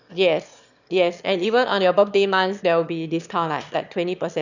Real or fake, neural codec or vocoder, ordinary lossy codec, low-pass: fake; autoencoder, 22.05 kHz, a latent of 192 numbers a frame, VITS, trained on one speaker; none; 7.2 kHz